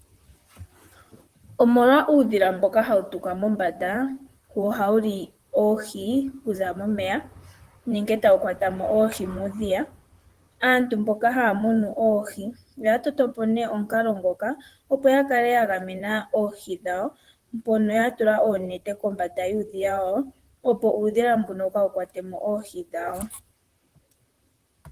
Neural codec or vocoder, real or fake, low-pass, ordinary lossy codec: vocoder, 44.1 kHz, 128 mel bands, Pupu-Vocoder; fake; 14.4 kHz; Opus, 24 kbps